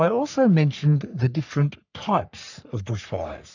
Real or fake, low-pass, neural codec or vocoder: fake; 7.2 kHz; codec, 44.1 kHz, 3.4 kbps, Pupu-Codec